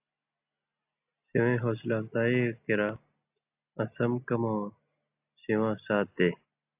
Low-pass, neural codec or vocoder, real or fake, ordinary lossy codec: 3.6 kHz; none; real; AAC, 32 kbps